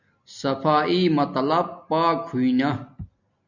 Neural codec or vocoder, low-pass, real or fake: none; 7.2 kHz; real